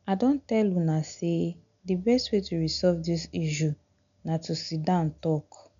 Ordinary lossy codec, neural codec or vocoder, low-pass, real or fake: none; none; 7.2 kHz; real